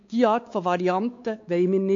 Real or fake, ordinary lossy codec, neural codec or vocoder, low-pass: fake; AAC, 48 kbps; codec, 16 kHz, 4 kbps, X-Codec, WavLM features, trained on Multilingual LibriSpeech; 7.2 kHz